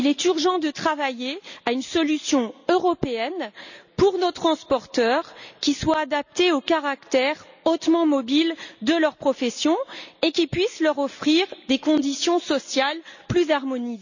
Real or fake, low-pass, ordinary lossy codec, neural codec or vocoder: real; 7.2 kHz; none; none